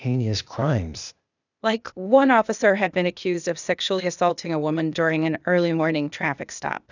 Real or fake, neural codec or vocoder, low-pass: fake; codec, 16 kHz, 0.8 kbps, ZipCodec; 7.2 kHz